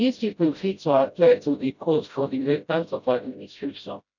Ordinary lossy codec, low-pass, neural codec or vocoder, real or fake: none; 7.2 kHz; codec, 16 kHz, 0.5 kbps, FreqCodec, smaller model; fake